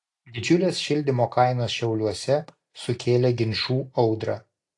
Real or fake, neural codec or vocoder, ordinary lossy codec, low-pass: real; none; AAC, 48 kbps; 10.8 kHz